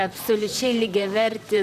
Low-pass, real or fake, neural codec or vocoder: 14.4 kHz; fake; vocoder, 44.1 kHz, 128 mel bands, Pupu-Vocoder